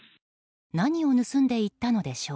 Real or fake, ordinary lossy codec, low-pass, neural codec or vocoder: real; none; none; none